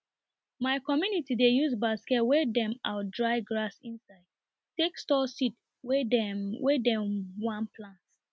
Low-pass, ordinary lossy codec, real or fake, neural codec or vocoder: none; none; real; none